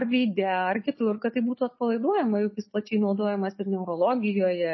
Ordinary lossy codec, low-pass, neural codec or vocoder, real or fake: MP3, 24 kbps; 7.2 kHz; none; real